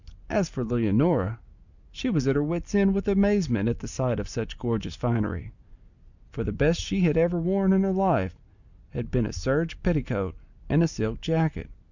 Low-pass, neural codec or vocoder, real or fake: 7.2 kHz; none; real